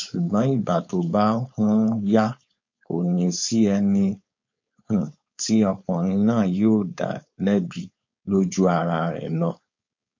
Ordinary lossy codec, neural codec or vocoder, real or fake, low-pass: MP3, 48 kbps; codec, 16 kHz, 4.8 kbps, FACodec; fake; 7.2 kHz